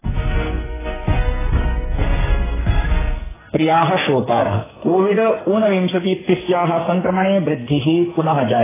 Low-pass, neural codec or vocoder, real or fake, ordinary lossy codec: 3.6 kHz; codec, 44.1 kHz, 2.6 kbps, SNAC; fake; AAC, 16 kbps